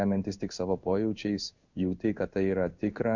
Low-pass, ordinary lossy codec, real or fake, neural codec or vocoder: 7.2 kHz; Opus, 64 kbps; fake; codec, 16 kHz in and 24 kHz out, 1 kbps, XY-Tokenizer